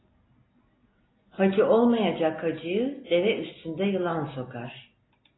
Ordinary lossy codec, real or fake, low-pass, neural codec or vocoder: AAC, 16 kbps; real; 7.2 kHz; none